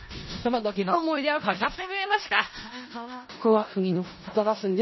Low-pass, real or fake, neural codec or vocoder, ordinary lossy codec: 7.2 kHz; fake; codec, 16 kHz in and 24 kHz out, 0.4 kbps, LongCat-Audio-Codec, four codebook decoder; MP3, 24 kbps